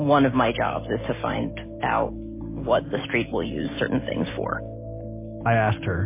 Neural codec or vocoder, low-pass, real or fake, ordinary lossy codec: none; 3.6 kHz; real; MP3, 16 kbps